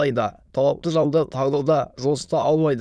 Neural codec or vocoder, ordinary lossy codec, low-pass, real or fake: autoencoder, 22.05 kHz, a latent of 192 numbers a frame, VITS, trained on many speakers; none; none; fake